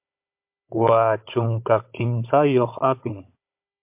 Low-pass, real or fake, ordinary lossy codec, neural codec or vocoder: 3.6 kHz; fake; MP3, 32 kbps; codec, 16 kHz, 16 kbps, FunCodec, trained on Chinese and English, 50 frames a second